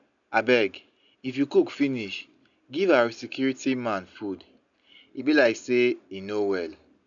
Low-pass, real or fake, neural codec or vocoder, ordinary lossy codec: 7.2 kHz; real; none; none